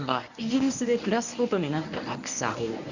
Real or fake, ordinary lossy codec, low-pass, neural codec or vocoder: fake; none; 7.2 kHz; codec, 24 kHz, 0.9 kbps, WavTokenizer, medium speech release version 1